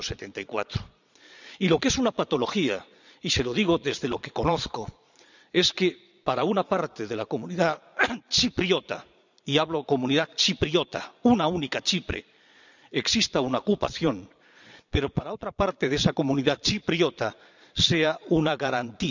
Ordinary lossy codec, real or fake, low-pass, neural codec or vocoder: none; fake; 7.2 kHz; vocoder, 22.05 kHz, 80 mel bands, Vocos